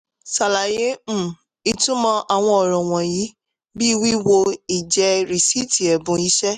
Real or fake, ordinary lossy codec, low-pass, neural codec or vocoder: real; none; 14.4 kHz; none